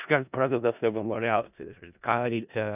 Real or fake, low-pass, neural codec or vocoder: fake; 3.6 kHz; codec, 16 kHz in and 24 kHz out, 0.4 kbps, LongCat-Audio-Codec, four codebook decoder